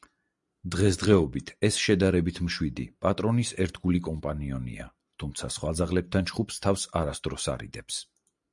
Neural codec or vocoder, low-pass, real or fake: none; 10.8 kHz; real